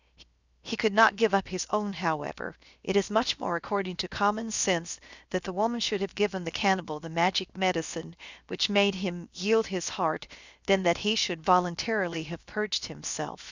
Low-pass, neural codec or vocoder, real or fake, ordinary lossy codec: 7.2 kHz; codec, 16 kHz, 0.7 kbps, FocalCodec; fake; Opus, 64 kbps